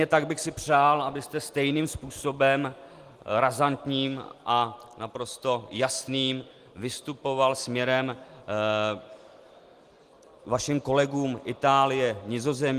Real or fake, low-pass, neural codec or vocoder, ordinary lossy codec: real; 14.4 kHz; none; Opus, 24 kbps